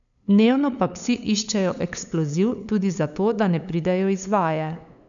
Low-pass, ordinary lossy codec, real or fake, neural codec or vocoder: 7.2 kHz; none; fake; codec, 16 kHz, 8 kbps, FunCodec, trained on LibriTTS, 25 frames a second